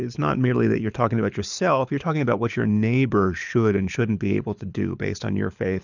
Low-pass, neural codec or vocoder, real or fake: 7.2 kHz; codec, 24 kHz, 6 kbps, HILCodec; fake